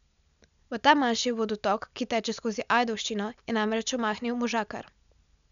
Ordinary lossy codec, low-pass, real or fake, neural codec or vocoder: none; 7.2 kHz; real; none